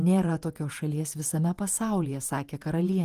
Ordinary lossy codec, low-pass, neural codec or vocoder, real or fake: Opus, 24 kbps; 14.4 kHz; vocoder, 48 kHz, 128 mel bands, Vocos; fake